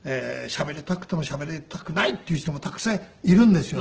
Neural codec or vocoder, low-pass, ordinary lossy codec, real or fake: none; 7.2 kHz; Opus, 16 kbps; real